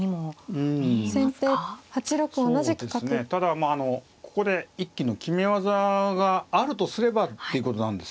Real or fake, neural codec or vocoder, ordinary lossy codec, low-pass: real; none; none; none